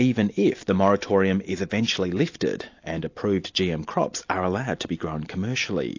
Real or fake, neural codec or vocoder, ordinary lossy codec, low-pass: real; none; AAC, 48 kbps; 7.2 kHz